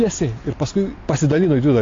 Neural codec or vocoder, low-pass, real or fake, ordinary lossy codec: none; 7.2 kHz; real; AAC, 32 kbps